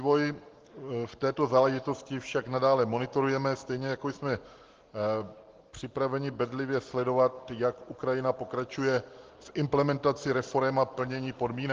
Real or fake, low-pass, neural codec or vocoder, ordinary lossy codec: real; 7.2 kHz; none; Opus, 16 kbps